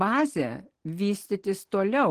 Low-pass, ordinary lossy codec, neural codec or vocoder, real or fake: 14.4 kHz; Opus, 16 kbps; none; real